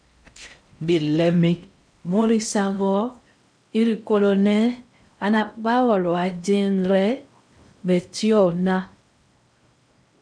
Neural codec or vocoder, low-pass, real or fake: codec, 16 kHz in and 24 kHz out, 0.6 kbps, FocalCodec, streaming, 4096 codes; 9.9 kHz; fake